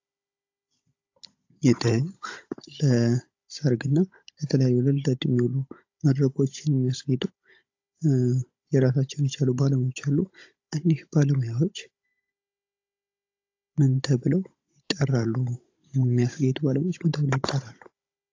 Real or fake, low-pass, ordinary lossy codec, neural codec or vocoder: fake; 7.2 kHz; AAC, 48 kbps; codec, 16 kHz, 16 kbps, FunCodec, trained on Chinese and English, 50 frames a second